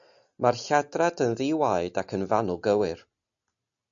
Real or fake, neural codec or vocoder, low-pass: real; none; 7.2 kHz